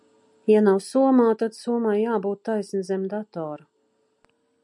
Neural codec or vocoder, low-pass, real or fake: none; 10.8 kHz; real